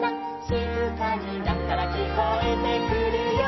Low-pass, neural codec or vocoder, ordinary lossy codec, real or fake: 7.2 kHz; none; MP3, 24 kbps; real